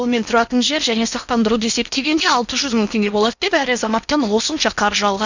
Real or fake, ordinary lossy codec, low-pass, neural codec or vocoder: fake; none; 7.2 kHz; codec, 16 kHz in and 24 kHz out, 0.8 kbps, FocalCodec, streaming, 65536 codes